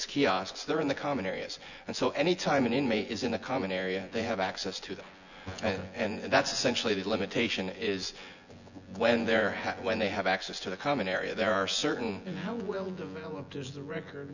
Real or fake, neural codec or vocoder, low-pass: fake; vocoder, 24 kHz, 100 mel bands, Vocos; 7.2 kHz